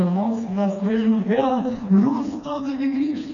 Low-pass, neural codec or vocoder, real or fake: 7.2 kHz; codec, 16 kHz, 2 kbps, FreqCodec, smaller model; fake